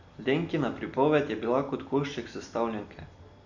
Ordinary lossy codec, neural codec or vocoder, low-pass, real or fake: none; none; 7.2 kHz; real